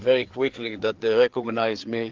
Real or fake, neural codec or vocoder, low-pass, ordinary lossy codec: fake; codec, 16 kHz, 4 kbps, FreqCodec, larger model; 7.2 kHz; Opus, 16 kbps